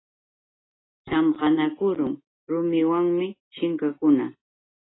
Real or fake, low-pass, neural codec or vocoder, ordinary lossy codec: real; 7.2 kHz; none; AAC, 16 kbps